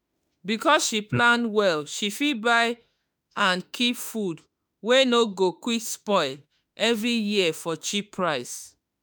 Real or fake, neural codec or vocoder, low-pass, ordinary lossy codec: fake; autoencoder, 48 kHz, 32 numbers a frame, DAC-VAE, trained on Japanese speech; none; none